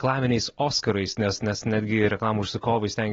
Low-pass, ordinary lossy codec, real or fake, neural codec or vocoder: 7.2 kHz; AAC, 24 kbps; real; none